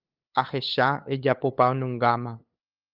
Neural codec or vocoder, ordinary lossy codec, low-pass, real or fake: codec, 16 kHz, 8 kbps, FunCodec, trained on LibriTTS, 25 frames a second; Opus, 24 kbps; 5.4 kHz; fake